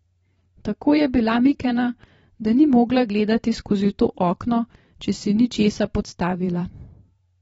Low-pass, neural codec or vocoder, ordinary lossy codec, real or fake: 19.8 kHz; vocoder, 44.1 kHz, 128 mel bands every 512 samples, BigVGAN v2; AAC, 24 kbps; fake